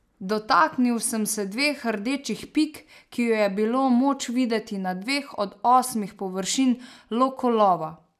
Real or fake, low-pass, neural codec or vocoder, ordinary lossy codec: real; 14.4 kHz; none; none